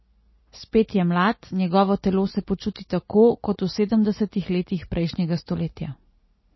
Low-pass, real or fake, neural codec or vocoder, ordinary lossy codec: 7.2 kHz; real; none; MP3, 24 kbps